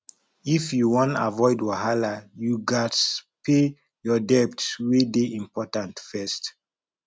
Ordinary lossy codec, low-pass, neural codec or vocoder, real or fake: none; none; none; real